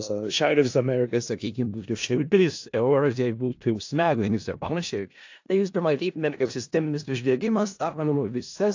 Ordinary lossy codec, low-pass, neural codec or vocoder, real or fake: AAC, 48 kbps; 7.2 kHz; codec, 16 kHz in and 24 kHz out, 0.4 kbps, LongCat-Audio-Codec, four codebook decoder; fake